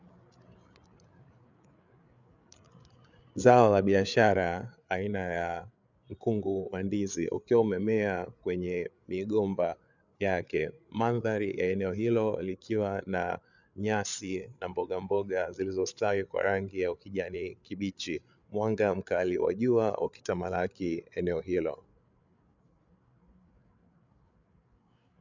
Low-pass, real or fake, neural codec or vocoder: 7.2 kHz; fake; codec, 16 kHz, 8 kbps, FreqCodec, larger model